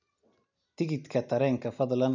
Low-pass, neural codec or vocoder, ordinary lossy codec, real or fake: 7.2 kHz; none; none; real